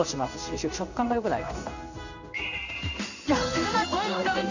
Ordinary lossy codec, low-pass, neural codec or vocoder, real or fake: none; 7.2 kHz; codec, 16 kHz in and 24 kHz out, 1 kbps, XY-Tokenizer; fake